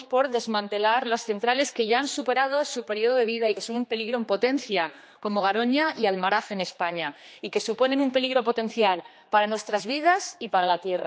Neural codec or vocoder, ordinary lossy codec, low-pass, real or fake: codec, 16 kHz, 2 kbps, X-Codec, HuBERT features, trained on general audio; none; none; fake